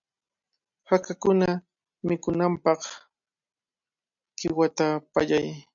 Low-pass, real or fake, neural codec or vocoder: 7.2 kHz; real; none